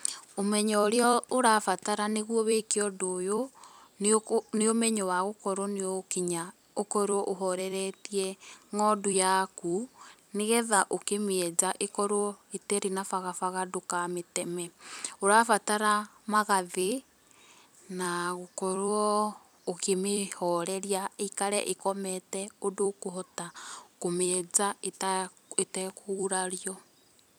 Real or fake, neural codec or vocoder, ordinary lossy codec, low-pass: fake; vocoder, 44.1 kHz, 128 mel bands every 256 samples, BigVGAN v2; none; none